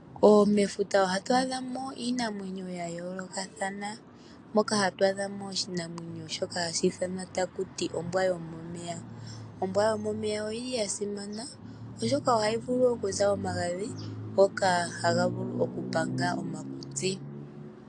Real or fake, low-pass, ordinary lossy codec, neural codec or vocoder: real; 9.9 kHz; AAC, 48 kbps; none